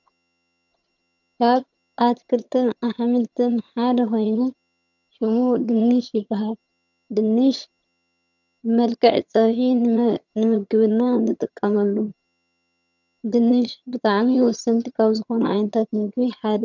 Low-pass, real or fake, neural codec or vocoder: 7.2 kHz; fake; vocoder, 22.05 kHz, 80 mel bands, HiFi-GAN